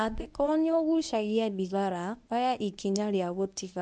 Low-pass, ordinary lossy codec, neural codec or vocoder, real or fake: none; none; codec, 24 kHz, 0.9 kbps, WavTokenizer, medium speech release version 1; fake